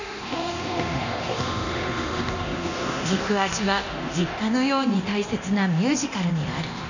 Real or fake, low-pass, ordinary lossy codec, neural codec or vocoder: fake; 7.2 kHz; none; codec, 24 kHz, 0.9 kbps, DualCodec